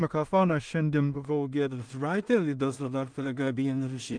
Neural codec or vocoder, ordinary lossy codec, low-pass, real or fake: codec, 16 kHz in and 24 kHz out, 0.4 kbps, LongCat-Audio-Codec, two codebook decoder; Opus, 32 kbps; 9.9 kHz; fake